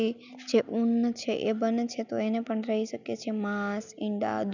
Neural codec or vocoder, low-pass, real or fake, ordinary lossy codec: none; 7.2 kHz; real; none